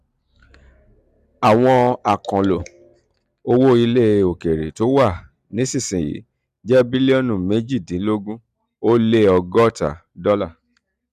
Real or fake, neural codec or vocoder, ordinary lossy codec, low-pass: real; none; none; 14.4 kHz